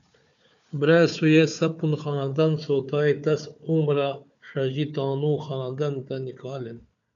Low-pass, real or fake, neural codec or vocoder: 7.2 kHz; fake; codec, 16 kHz, 4 kbps, FunCodec, trained on Chinese and English, 50 frames a second